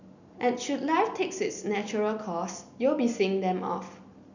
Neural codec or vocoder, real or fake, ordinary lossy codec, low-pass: none; real; none; 7.2 kHz